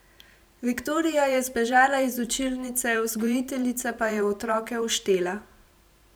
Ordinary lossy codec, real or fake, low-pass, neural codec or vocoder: none; fake; none; vocoder, 44.1 kHz, 128 mel bands every 512 samples, BigVGAN v2